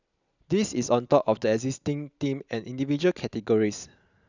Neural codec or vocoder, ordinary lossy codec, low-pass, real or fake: none; none; 7.2 kHz; real